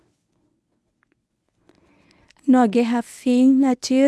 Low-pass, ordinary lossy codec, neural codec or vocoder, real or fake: none; none; codec, 24 kHz, 0.9 kbps, WavTokenizer, medium speech release version 1; fake